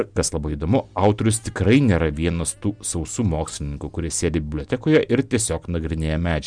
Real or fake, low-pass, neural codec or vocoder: real; 9.9 kHz; none